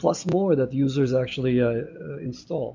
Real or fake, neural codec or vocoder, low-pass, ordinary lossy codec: real; none; 7.2 kHz; MP3, 48 kbps